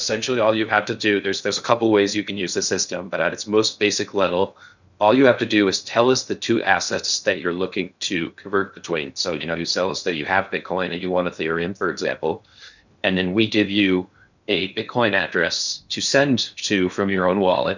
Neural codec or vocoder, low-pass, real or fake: codec, 16 kHz in and 24 kHz out, 0.6 kbps, FocalCodec, streaming, 2048 codes; 7.2 kHz; fake